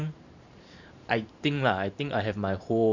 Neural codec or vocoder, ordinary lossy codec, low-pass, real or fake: vocoder, 44.1 kHz, 128 mel bands every 512 samples, BigVGAN v2; none; 7.2 kHz; fake